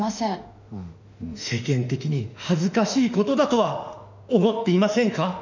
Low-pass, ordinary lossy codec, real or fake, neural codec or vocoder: 7.2 kHz; none; fake; autoencoder, 48 kHz, 32 numbers a frame, DAC-VAE, trained on Japanese speech